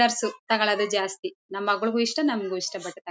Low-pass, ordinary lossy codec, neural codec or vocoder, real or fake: none; none; none; real